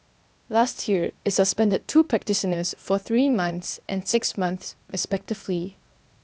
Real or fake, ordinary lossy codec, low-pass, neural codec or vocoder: fake; none; none; codec, 16 kHz, 0.8 kbps, ZipCodec